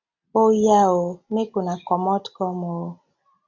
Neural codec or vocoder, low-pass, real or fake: none; 7.2 kHz; real